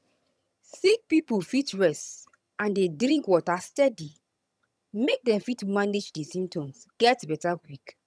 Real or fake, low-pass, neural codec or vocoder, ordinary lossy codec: fake; none; vocoder, 22.05 kHz, 80 mel bands, HiFi-GAN; none